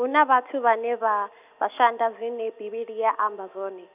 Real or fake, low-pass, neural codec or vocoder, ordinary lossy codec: real; 3.6 kHz; none; none